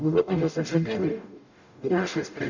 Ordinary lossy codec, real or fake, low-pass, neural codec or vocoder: none; fake; 7.2 kHz; codec, 44.1 kHz, 0.9 kbps, DAC